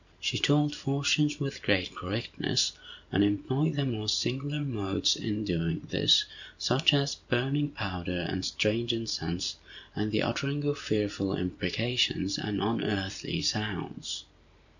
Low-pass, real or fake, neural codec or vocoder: 7.2 kHz; fake; vocoder, 44.1 kHz, 128 mel bands every 512 samples, BigVGAN v2